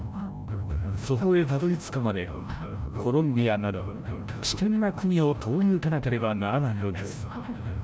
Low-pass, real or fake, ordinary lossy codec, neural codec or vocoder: none; fake; none; codec, 16 kHz, 0.5 kbps, FreqCodec, larger model